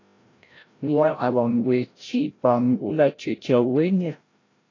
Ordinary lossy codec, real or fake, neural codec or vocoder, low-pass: AAC, 32 kbps; fake; codec, 16 kHz, 0.5 kbps, FreqCodec, larger model; 7.2 kHz